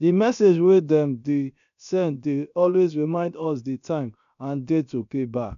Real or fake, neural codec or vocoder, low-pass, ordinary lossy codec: fake; codec, 16 kHz, about 1 kbps, DyCAST, with the encoder's durations; 7.2 kHz; none